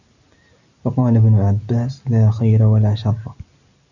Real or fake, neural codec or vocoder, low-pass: real; none; 7.2 kHz